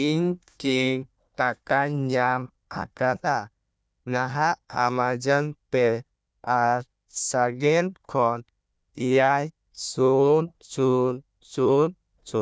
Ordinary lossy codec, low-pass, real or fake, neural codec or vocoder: none; none; fake; codec, 16 kHz, 1 kbps, FunCodec, trained on Chinese and English, 50 frames a second